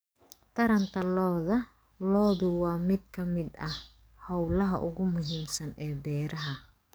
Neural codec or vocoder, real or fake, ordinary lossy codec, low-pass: codec, 44.1 kHz, 7.8 kbps, DAC; fake; none; none